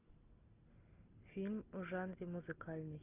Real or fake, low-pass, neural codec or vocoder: real; 3.6 kHz; none